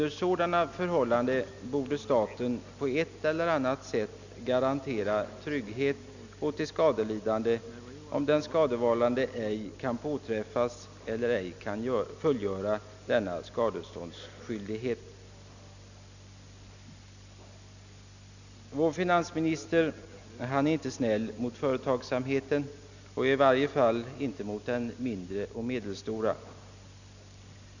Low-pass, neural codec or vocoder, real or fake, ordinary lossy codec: 7.2 kHz; none; real; none